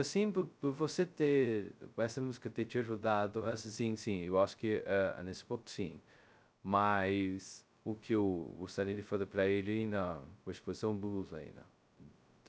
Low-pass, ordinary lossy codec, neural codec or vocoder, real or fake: none; none; codec, 16 kHz, 0.2 kbps, FocalCodec; fake